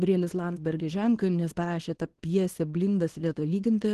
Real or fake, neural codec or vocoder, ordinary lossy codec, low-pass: fake; codec, 24 kHz, 0.9 kbps, WavTokenizer, small release; Opus, 16 kbps; 10.8 kHz